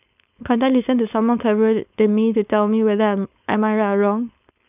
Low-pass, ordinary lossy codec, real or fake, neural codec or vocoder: 3.6 kHz; none; fake; codec, 16 kHz, 4.8 kbps, FACodec